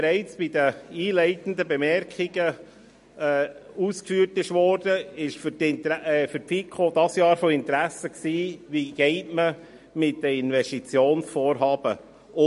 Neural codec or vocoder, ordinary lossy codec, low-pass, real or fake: none; MP3, 48 kbps; 14.4 kHz; real